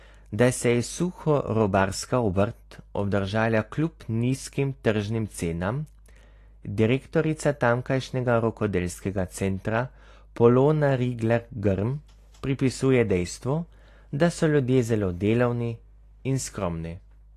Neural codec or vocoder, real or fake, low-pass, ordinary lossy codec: none; real; 14.4 kHz; AAC, 48 kbps